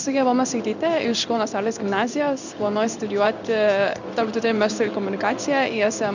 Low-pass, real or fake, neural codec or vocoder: 7.2 kHz; fake; codec, 16 kHz in and 24 kHz out, 1 kbps, XY-Tokenizer